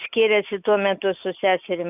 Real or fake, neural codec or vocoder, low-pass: real; none; 3.6 kHz